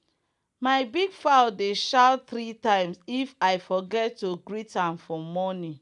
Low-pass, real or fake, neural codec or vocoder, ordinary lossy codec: 10.8 kHz; real; none; none